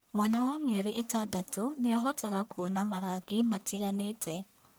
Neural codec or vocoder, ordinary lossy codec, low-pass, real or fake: codec, 44.1 kHz, 1.7 kbps, Pupu-Codec; none; none; fake